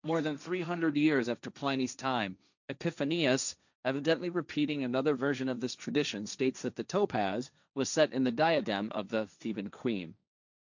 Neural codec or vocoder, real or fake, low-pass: codec, 16 kHz, 1.1 kbps, Voila-Tokenizer; fake; 7.2 kHz